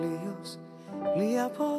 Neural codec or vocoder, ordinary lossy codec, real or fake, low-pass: none; AAC, 64 kbps; real; 14.4 kHz